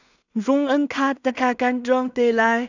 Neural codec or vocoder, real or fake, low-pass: codec, 16 kHz in and 24 kHz out, 0.4 kbps, LongCat-Audio-Codec, two codebook decoder; fake; 7.2 kHz